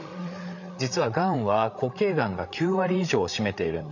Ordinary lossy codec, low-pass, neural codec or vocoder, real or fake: none; 7.2 kHz; codec, 16 kHz, 8 kbps, FreqCodec, larger model; fake